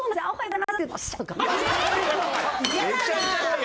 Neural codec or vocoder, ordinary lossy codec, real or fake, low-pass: none; none; real; none